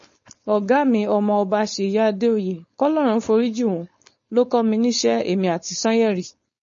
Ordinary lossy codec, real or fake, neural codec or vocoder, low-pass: MP3, 32 kbps; fake; codec, 16 kHz, 4.8 kbps, FACodec; 7.2 kHz